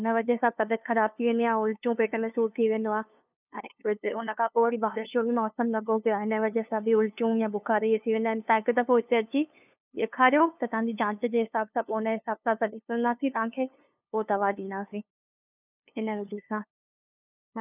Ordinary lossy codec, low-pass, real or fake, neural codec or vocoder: none; 3.6 kHz; fake; codec, 16 kHz, 2 kbps, FunCodec, trained on LibriTTS, 25 frames a second